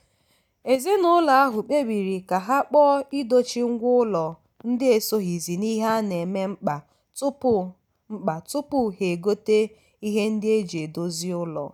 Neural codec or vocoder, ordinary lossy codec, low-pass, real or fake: none; none; 19.8 kHz; real